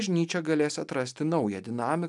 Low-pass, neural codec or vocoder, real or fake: 10.8 kHz; none; real